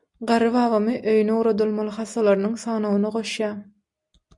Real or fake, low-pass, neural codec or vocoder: real; 10.8 kHz; none